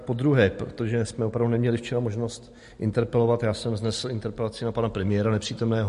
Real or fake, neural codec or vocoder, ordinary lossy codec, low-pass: real; none; MP3, 48 kbps; 14.4 kHz